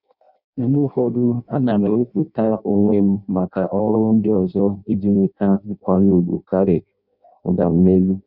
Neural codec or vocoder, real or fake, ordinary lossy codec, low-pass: codec, 16 kHz in and 24 kHz out, 0.6 kbps, FireRedTTS-2 codec; fake; none; 5.4 kHz